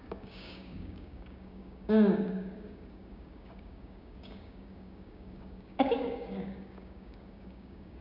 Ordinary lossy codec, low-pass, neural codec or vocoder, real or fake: none; 5.4 kHz; codec, 16 kHz, 6 kbps, DAC; fake